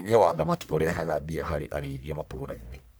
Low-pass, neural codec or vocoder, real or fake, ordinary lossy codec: none; codec, 44.1 kHz, 1.7 kbps, Pupu-Codec; fake; none